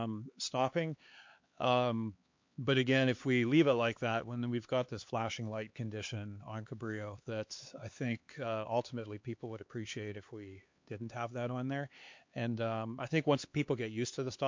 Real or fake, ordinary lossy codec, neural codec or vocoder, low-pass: fake; MP3, 48 kbps; codec, 16 kHz, 4 kbps, X-Codec, HuBERT features, trained on LibriSpeech; 7.2 kHz